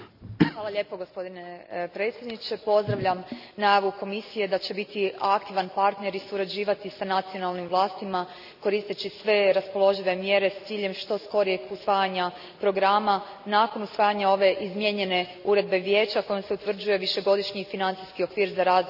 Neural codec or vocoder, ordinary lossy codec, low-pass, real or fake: none; none; 5.4 kHz; real